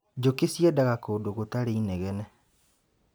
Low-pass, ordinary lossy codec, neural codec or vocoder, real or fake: none; none; none; real